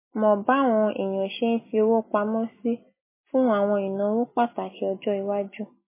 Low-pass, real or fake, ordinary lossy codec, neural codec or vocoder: 3.6 kHz; real; MP3, 16 kbps; none